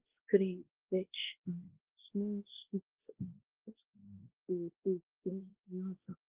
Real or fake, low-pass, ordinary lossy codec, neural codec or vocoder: fake; 3.6 kHz; Opus, 16 kbps; codec, 16 kHz, 1 kbps, X-Codec, WavLM features, trained on Multilingual LibriSpeech